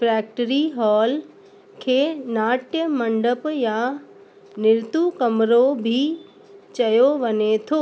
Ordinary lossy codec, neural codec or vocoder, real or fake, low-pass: none; none; real; none